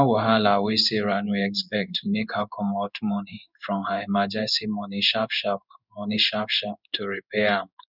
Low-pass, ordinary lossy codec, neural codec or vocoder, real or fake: 5.4 kHz; none; codec, 16 kHz in and 24 kHz out, 1 kbps, XY-Tokenizer; fake